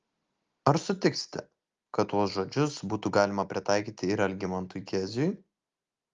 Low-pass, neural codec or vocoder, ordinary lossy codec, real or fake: 7.2 kHz; none; Opus, 32 kbps; real